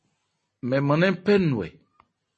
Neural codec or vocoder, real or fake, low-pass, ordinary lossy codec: none; real; 10.8 kHz; MP3, 32 kbps